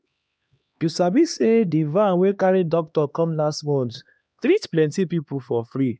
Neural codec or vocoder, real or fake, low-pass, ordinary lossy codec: codec, 16 kHz, 2 kbps, X-Codec, HuBERT features, trained on LibriSpeech; fake; none; none